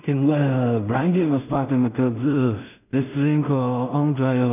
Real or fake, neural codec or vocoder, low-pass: fake; codec, 16 kHz in and 24 kHz out, 0.4 kbps, LongCat-Audio-Codec, two codebook decoder; 3.6 kHz